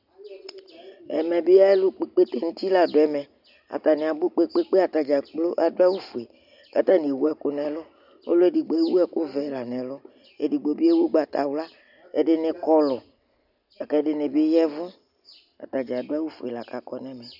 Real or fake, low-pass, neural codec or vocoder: real; 5.4 kHz; none